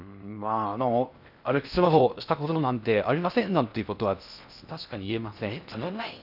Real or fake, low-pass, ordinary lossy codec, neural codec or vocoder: fake; 5.4 kHz; none; codec, 16 kHz in and 24 kHz out, 0.6 kbps, FocalCodec, streaming, 2048 codes